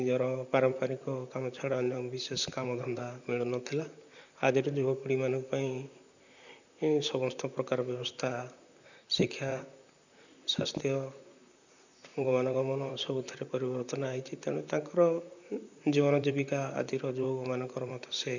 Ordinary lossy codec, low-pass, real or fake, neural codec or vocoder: none; 7.2 kHz; fake; vocoder, 44.1 kHz, 128 mel bands, Pupu-Vocoder